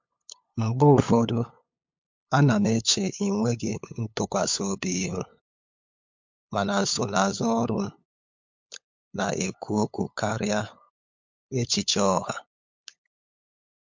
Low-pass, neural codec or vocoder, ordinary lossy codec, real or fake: 7.2 kHz; codec, 16 kHz, 8 kbps, FunCodec, trained on LibriTTS, 25 frames a second; MP3, 48 kbps; fake